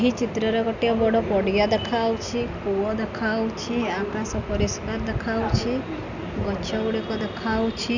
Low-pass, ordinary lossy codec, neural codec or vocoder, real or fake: 7.2 kHz; none; none; real